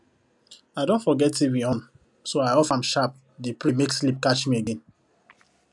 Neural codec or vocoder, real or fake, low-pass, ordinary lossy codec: none; real; 10.8 kHz; none